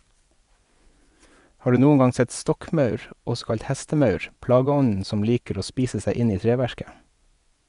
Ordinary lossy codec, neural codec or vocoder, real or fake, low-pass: none; vocoder, 24 kHz, 100 mel bands, Vocos; fake; 10.8 kHz